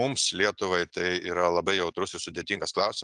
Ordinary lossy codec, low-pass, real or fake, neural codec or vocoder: Opus, 32 kbps; 10.8 kHz; real; none